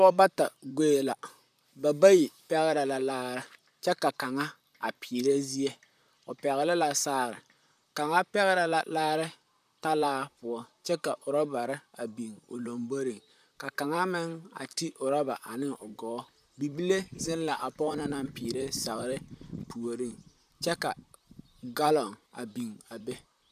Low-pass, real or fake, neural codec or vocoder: 14.4 kHz; fake; vocoder, 44.1 kHz, 128 mel bands, Pupu-Vocoder